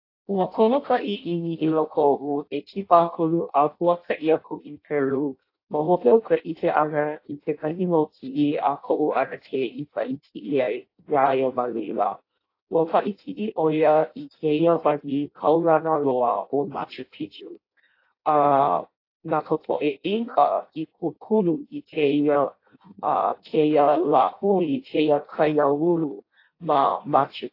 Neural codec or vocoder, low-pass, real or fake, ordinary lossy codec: codec, 16 kHz in and 24 kHz out, 0.6 kbps, FireRedTTS-2 codec; 5.4 kHz; fake; AAC, 32 kbps